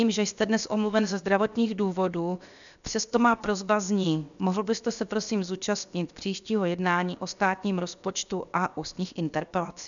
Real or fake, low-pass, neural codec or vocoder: fake; 7.2 kHz; codec, 16 kHz, 0.7 kbps, FocalCodec